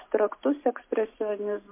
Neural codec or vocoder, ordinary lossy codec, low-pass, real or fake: none; MP3, 24 kbps; 3.6 kHz; real